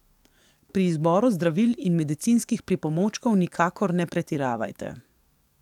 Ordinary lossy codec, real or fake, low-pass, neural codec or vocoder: none; fake; 19.8 kHz; codec, 44.1 kHz, 7.8 kbps, DAC